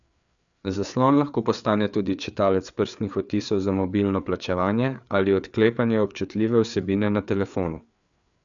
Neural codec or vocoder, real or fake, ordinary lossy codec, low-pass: codec, 16 kHz, 4 kbps, FreqCodec, larger model; fake; none; 7.2 kHz